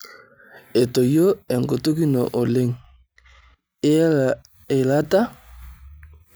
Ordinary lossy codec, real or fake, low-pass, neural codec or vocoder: none; real; none; none